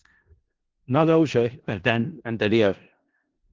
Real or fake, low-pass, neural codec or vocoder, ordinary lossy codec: fake; 7.2 kHz; codec, 16 kHz in and 24 kHz out, 0.4 kbps, LongCat-Audio-Codec, four codebook decoder; Opus, 16 kbps